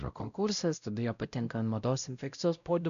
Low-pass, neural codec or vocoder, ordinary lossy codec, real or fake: 7.2 kHz; codec, 16 kHz, 0.5 kbps, X-Codec, WavLM features, trained on Multilingual LibriSpeech; MP3, 96 kbps; fake